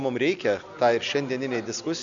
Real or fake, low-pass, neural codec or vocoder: real; 7.2 kHz; none